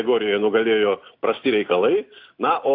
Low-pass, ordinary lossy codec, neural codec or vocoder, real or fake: 5.4 kHz; AAC, 32 kbps; none; real